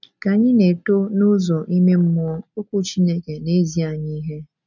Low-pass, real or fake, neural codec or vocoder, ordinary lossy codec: 7.2 kHz; real; none; none